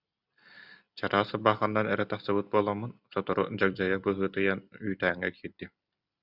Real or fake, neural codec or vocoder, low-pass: real; none; 5.4 kHz